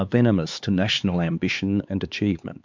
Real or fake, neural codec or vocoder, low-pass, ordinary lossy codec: fake; codec, 16 kHz, 4 kbps, X-Codec, HuBERT features, trained on LibriSpeech; 7.2 kHz; MP3, 64 kbps